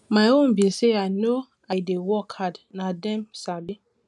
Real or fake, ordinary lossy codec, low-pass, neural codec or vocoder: real; none; none; none